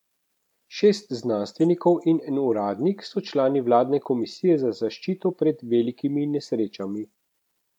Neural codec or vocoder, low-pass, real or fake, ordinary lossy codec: none; 19.8 kHz; real; none